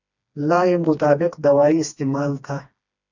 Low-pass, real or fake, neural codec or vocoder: 7.2 kHz; fake; codec, 16 kHz, 2 kbps, FreqCodec, smaller model